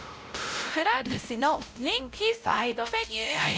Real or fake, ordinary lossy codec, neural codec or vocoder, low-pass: fake; none; codec, 16 kHz, 0.5 kbps, X-Codec, WavLM features, trained on Multilingual LibriSpeech; none